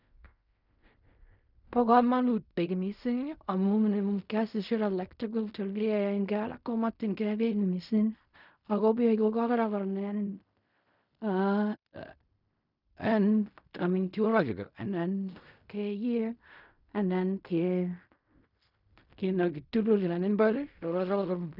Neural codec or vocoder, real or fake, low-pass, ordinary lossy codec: codec, 16 kHz in and 24 kHz out, 0.4 kbps, LongCat-Audio-Codec, fine tuned four codebook decoder; fake; 5.4 kHz; none